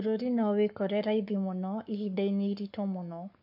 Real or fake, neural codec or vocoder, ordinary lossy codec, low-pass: fake; codec, 44.1 kHz, 7.8 kbps, Pupu-Codec; none; 5.4 kHz